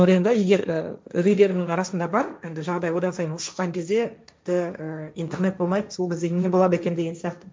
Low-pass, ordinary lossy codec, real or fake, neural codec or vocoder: none; none; fake; codec, 16 kHz, 1.1 kbps, Voila-Tokenizer